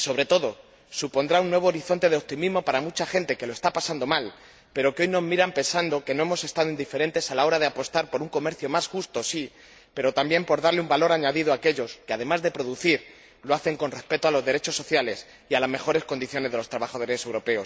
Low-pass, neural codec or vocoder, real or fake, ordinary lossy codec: none; none; real; none